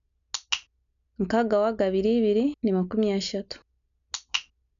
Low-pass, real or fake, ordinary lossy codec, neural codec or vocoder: 7.2 kHz; real; none; none